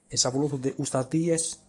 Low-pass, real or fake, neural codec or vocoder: 10.8 kHz; fake; codec, 44.1 kHz, 7.8 kbps, DAC